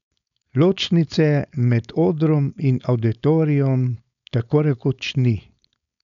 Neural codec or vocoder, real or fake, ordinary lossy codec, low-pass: codec, 16 kHz, 4.8 kbps, FACodec; fake; none; 7.2 kHz